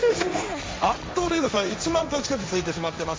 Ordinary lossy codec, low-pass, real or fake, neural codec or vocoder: none; none; fake; codec, 16 kHz, 1.1 kbps, Voila-Tokenizer